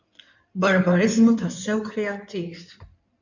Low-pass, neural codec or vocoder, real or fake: 7.2 kHz; codec, 16 kHz in and 24 kHz out, 2.2 kbps, FireRedTTS-2 codec; fake